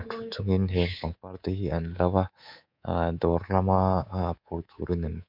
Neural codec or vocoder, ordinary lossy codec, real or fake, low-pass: codec, 24 kHz, 3.1 kbps, DualCodec; MP3, 48 kbps; fake; 5.4 kHz